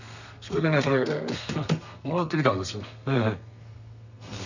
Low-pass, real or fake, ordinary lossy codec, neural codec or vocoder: 7.2 kHz; fake; none; codec, 32 kHz, 1.9 kbps, SNAC